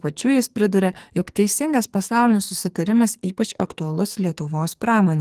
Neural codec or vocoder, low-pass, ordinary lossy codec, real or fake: codec, 44.1 kHz, 2.6 kbps, SNAC; 14.4 kHz; Opus, 24 kbps; fake